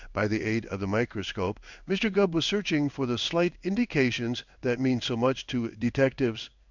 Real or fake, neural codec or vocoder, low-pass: fake; codec, 16 kHz in and 24 kHz out, 1 kbps, XY-Tokenizer; 7.2 kHz